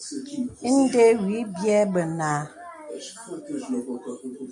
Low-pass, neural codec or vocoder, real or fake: 10.8 kHz; none; real